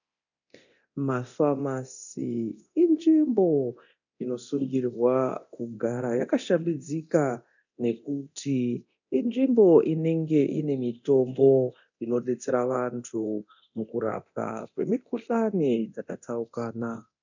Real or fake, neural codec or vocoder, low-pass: fake; codec, 24 kHz, 0.9 kbps, DualCodec; 7.2 kHz